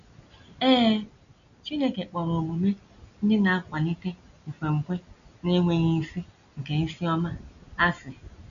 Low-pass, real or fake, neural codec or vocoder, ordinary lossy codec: 7.2 kHz; real; none; none